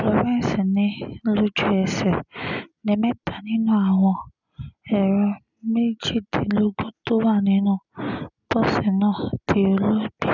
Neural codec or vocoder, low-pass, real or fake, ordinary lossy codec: none; 7.2 kHz; real; none